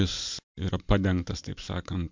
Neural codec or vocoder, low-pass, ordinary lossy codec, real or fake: vocoder, 44.1 kHz, 128 mel bands every 256 samples, BigVGAN v2; 7.2 kHz; MP3, 64 kbps; fake